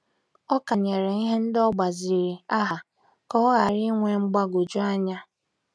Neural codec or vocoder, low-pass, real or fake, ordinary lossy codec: none; none; real; none